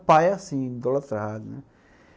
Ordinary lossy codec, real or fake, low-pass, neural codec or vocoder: none; real; none; none